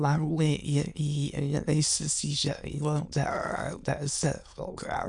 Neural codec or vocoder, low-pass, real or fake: autoencoder, 22.05 kHz, a latent of 192 numbers a frame, VITS, trained on many speakers; 9.9 kHz; fake